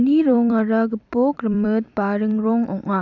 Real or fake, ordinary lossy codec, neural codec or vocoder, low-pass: fake; none; vocoder, 44.1 kHz, 128 mel bands every 256 samples, BigVGAN v2; 7.2 kHz